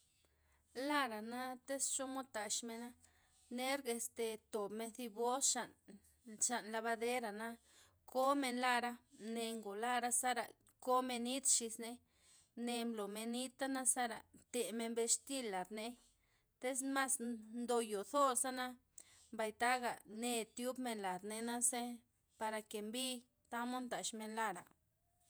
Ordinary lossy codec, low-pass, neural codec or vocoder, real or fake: none; none; vocoder, 48 kHz, 128 mel bands, Vocos; fake